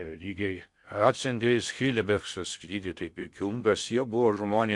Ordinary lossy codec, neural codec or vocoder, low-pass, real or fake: Opus, 64 kbps; codec, 16 kHz in and 24 kHz out, 0.6 kbps, FocalCodec, streaming, 2048 codes; 10.8 kHz; fake